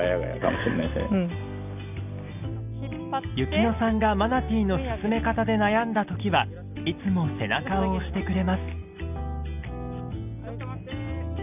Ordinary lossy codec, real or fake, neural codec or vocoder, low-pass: none; real; none; 3.6 kHz